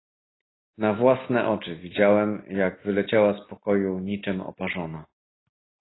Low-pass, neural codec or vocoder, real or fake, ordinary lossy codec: 7.2 kHz; none; real; AAC, 16 kbps